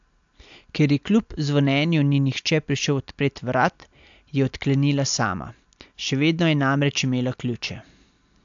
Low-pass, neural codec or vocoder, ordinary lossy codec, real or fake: 7.2 kHz; none; AAC, 64 kbps; real